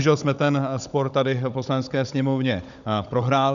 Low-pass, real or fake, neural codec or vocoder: 7.2 kHz; fake; codec, 16 kHz, 16 kbps, FunCodec, trained on Chinese and English, 50 frames a second